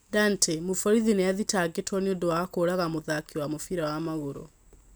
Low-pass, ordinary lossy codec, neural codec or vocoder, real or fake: none; none; none; real